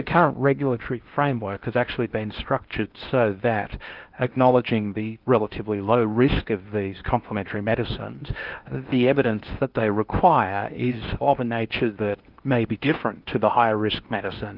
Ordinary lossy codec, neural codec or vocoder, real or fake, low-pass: Opus, 24 kbps; codec, 16 kHz in and 24 kHz out, 0.8 kbps, FocalCodec, streaming, 65536 codes; fake; 5.4 kHz